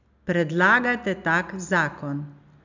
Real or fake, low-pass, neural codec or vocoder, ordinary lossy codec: real; 7.2 kHz; none; none